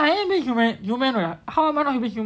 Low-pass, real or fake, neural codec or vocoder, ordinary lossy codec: none; real; none; none